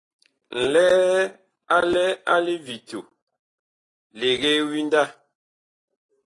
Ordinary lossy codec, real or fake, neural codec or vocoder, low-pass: AAC, 32 kbps; real; none; 10.8 kHz